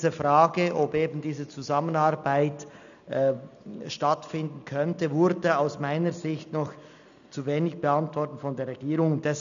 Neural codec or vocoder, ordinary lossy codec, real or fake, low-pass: none; none; real; 7.2 kHz